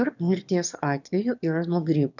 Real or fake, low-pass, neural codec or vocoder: fake; 7.2 kHz; autoencoder, 22.05 kHz, a latent of 192 numbers a frame, VITS, trained on one speaker